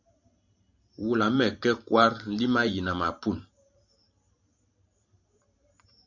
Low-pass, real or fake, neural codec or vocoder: 7.2 kHz; fake; vocoder, 44.1 kHz, 128 mel bands every 512 samples, BigVGAN v2